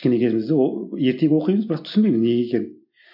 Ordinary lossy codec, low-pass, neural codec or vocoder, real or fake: MP3, 48 kbps; 5.4 kHz; none; real